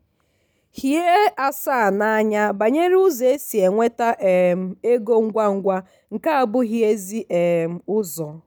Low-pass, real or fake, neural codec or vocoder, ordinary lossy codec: none; real; none; none